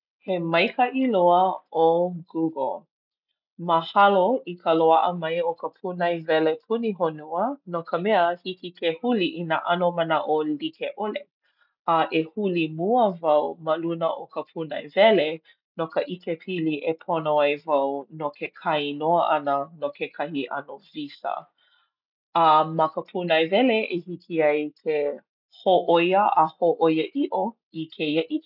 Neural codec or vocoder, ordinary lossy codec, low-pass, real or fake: none; none; 5.4 kHz; real